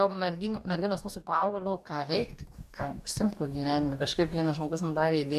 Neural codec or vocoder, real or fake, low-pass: codec, 44.1 kHz, 2.6 kbps, DAC; fake; 14.4 kHz